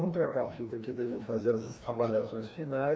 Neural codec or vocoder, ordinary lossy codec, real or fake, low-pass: codec, 16 kHz, 1 kbps, FreqCodec, larger model; none; fake; none